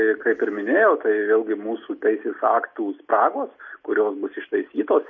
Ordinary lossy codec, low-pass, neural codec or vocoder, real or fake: MP3, 32 kbps; 7.2 kHz; none; real